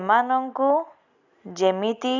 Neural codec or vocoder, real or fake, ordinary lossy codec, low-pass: none; real; none; 7.2 kHz